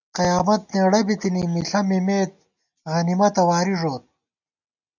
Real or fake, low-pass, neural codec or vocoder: real; 7.2 kHz; none